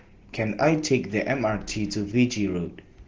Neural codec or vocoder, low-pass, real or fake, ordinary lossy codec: none; 7.2 kHz; real; Opus, 16 kbps